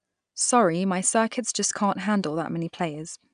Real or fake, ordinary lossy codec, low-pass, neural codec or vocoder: real; none; 9.9 kHz; none